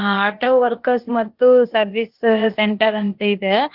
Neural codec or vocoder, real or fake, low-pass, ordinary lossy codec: codec, 16 kHz, 0.8 kbps, ZipCodec; fake; 5.4 kHz; Opus, 16 kbps